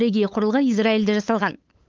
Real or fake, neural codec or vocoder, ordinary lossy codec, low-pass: fake; codec, 16 kHz, 8 kbps, FunCodec, trained on LibriTTS, 25 frames a second; Opus, 24 kbps; 7.2 kHz